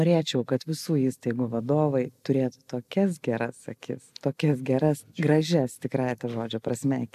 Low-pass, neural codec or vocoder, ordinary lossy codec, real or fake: 14.4 kHz; none; AAC, 96 kbps; real